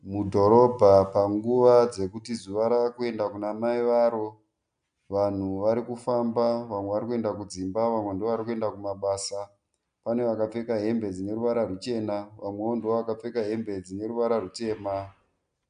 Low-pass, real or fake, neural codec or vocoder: 9.9 kHz; real; none